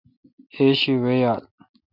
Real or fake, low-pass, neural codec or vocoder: real; 5.4 kHz; none